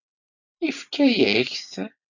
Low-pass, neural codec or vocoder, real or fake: 7.2 kHz; vocoder, 44.1 kHz, 128 mel bands every 256 samples, BigVGAN v2; fake